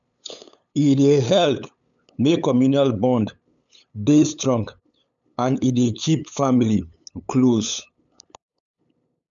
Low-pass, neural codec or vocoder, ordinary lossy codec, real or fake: 7.2 kHz; codec, 16 kHz, 8 kbps, FunCodec, trained on LibriTTS, 25 frames a second; none; fake